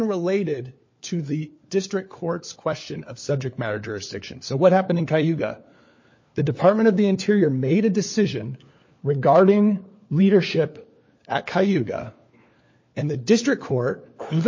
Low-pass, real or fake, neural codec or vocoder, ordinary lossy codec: 7.2 kHz; fake; codec, 16 kHz, 4 kbps, FunCodec, trained on LibriTTS, 50 frames a second; MP3, 32 kbps